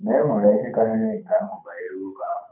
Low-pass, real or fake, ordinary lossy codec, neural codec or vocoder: 3.6 kHz; fake; none; codec, 24 kHz, 6 kbps, HILCodec